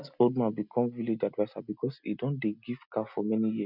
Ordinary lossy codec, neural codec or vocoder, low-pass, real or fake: none; none; 5.4 kHz; real